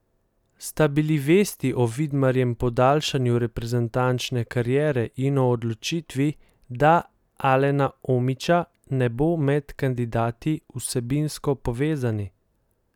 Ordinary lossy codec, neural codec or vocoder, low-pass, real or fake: none; none; 19.8 kHz; real